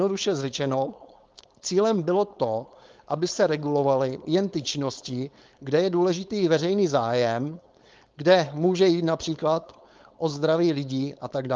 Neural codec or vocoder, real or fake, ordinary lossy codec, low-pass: codec, 16 kHz, 4.8 kbps, FACodec; fake; Opus, 32 kbps; 7.2 kHz